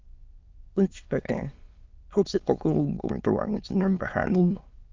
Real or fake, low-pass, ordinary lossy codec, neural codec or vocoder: fake; 7.2 kHz; Opus, 24 kbps; autoencoder, 22.05 kHz, a latent of 192 numbers a frame, VITS, trained on many speakers